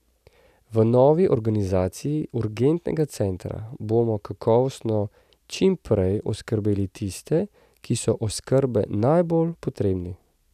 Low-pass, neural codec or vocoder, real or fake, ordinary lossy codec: 14.4 kHz; none; real; none